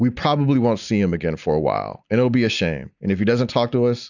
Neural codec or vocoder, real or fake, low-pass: none; real; 7.2 kHz